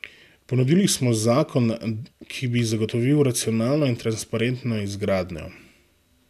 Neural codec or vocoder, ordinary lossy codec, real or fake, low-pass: none; none; real; 14.4 kHz